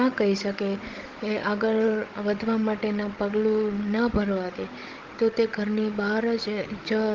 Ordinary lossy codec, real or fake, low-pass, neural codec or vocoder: Opus, 16 kbps; fake; 7.2 kHz; codec, 16 kHz, 16 kbps, FunCodec, trained on Chinese and English, 50 frames a second